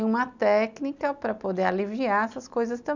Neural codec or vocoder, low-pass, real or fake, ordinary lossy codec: none; 7.2 kHz; real; none